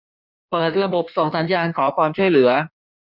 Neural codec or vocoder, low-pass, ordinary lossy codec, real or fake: codec, 16 kHz in and 24 kHz out, 1.1 kbps, FireRedTTS-2 codec; 5.4 kHz; none; fake